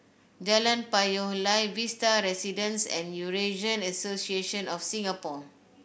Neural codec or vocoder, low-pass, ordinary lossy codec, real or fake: none; none; none; real